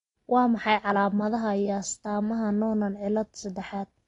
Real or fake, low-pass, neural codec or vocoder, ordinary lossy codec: real; 10.8 kHz; none; AAC, 32 kbps